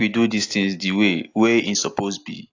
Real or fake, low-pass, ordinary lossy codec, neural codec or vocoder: real; 7.2 kHz; AAC, 48 kbps; none